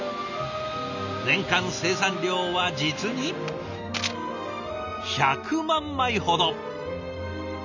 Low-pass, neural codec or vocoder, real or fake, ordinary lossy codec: 7.2 kHz; none; real; none